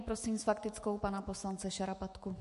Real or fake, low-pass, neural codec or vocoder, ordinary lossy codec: fake; 14.4 kHz; autoencoder, 48 kHz, 128 numbers a frame, DAC-VAE, trained on Japanese speech; MP3, 48 kbps